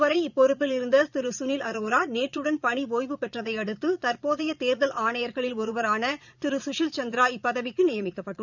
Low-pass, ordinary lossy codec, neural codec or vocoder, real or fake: 7.2 kHz; none; vocoder, 22.05 kHz, 80 mel bands, Vocos; fake